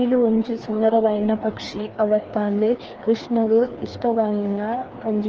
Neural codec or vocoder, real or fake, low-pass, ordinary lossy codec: codec, 16 kHz, 2 kbps, FreqCodec, larger model; fake; 7.2 kHz; Opus, 16 kbps